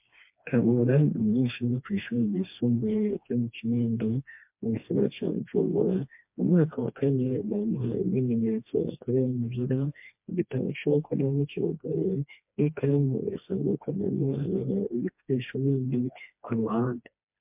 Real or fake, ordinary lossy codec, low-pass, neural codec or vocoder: fake; MP3, 32 kbps; 3.6 kHz; codec, 16 kHz, 2 kbps, FreqCodec, smaller model